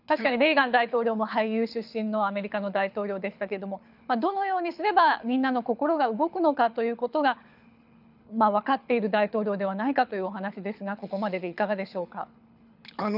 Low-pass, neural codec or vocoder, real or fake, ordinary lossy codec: 5.4 kHz; codec, 24 kHz, 6 kbps, HILCodec; fake; none